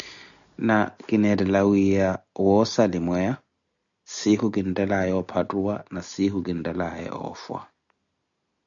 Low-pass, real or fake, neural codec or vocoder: 7.2 kHz; real; none